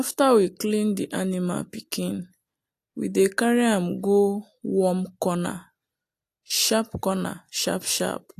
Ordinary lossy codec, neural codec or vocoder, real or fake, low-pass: AAC, 64 kbps; none; real; 14.4 kHz